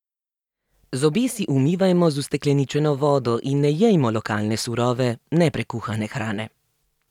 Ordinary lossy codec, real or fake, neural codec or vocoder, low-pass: none; real; none; 19.8 kHz